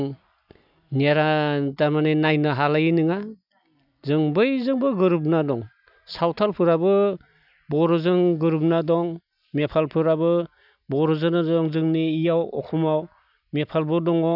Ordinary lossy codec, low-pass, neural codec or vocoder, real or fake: none; 5.4 kHz; none; real